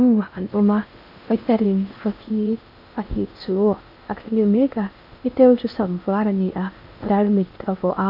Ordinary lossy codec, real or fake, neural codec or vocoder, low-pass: none; fake; codec, 16 kHz in and 24 kHz out, 0.6 kbps, FocalCodec, streaming, 2048 codes; 5.4 kHz